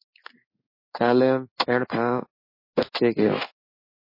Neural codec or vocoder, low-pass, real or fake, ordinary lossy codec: codec, 16 kHz in and 24 kHz out, 1 kbps, XY-Tokenizer; 5.4 kHz; fake; MP3, 24 kbps